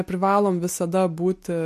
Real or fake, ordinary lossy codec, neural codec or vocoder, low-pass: real; MP3, 64 kbps; none; 14.4 kHz